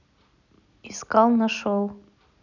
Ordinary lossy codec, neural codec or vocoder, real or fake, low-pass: none; none; real; 7.2 kHz